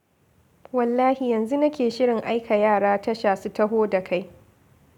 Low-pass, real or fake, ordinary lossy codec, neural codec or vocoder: 19.8 kHz; real; none; none